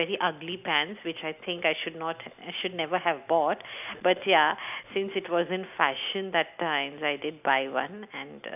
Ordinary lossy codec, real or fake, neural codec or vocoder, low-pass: none; real; none; 3.6 kHz